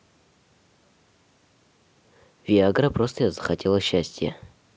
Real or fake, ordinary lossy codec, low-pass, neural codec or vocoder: real; none; none; none